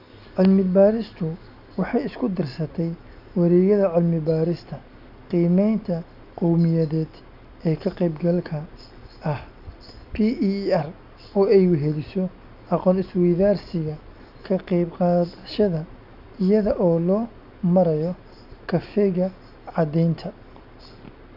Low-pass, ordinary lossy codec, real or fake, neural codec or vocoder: 5.4 kHz; none; real; none